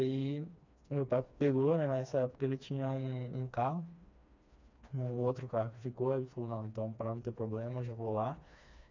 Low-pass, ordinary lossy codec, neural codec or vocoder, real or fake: 7.2 kHz; MP3, 64 kbps; codec, 16 kHz, 2 kbps, FreqCodec, smaller model; fake